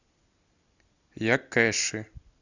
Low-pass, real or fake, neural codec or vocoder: 7.2 kHz; real; none